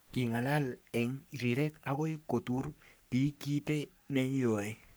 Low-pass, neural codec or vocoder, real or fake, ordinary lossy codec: none; codec, 44.1 kHz, 3.4 kbps, Pupu-Codec; fake; none